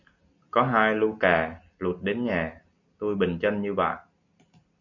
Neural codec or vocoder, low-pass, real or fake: none; 7.2 kHz; real